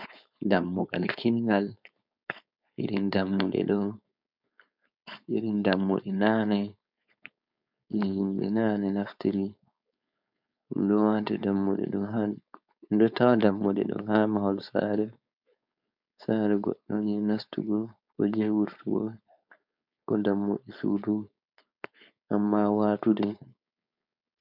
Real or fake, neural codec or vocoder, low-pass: fake; codec, 16 kHz, 4.8 kbps, FACodec; 5.4 kHz